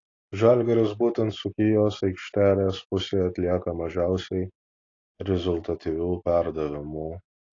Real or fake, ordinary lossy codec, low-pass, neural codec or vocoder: real; AAC, 32 kbps; 7.2 kHz; none